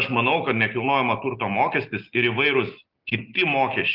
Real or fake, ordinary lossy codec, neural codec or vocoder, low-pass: real; Opus, 24 kbps; none; 5.4 kHz